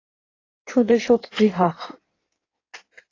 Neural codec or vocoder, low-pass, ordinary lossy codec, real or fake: codec, 16 kHz in and 24 kHz out, 1.1 kbps, FireRedTTS-2 codec; 7.2 kHz; AAC, 32 kbps; fake